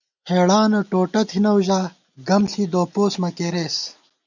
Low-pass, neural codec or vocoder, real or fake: 7.2 kHz; none; real